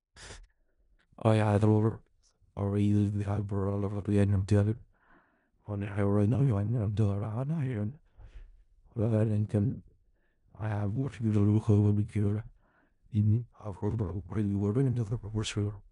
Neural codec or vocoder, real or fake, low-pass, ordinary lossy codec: codec, 16 kHz in and 24 kHz out, 0.4 kbps, LongCat-Audio-Codec, four codebook decoder; fake; 10.8 kHz; none